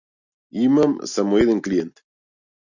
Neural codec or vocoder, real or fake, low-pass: none; real; 7.2 kHz